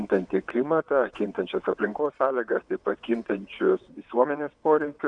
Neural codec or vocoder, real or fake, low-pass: vocoder, 22.05 kHz, 80 mel bands, WaveNeXt; fake; 9.9 kHz